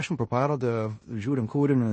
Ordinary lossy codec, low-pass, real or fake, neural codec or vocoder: MP3, 32 kbps; 9.9 kHz; fake; codec, 16 kHz in and 24 kHz out, 0.9 kbps, LongCat-Audio-Codec, four codebook decoder